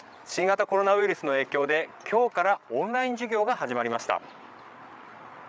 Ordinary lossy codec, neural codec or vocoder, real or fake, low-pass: none; codec, 16 kHz, 8 kbps, FreqCodec, larger model; fake; none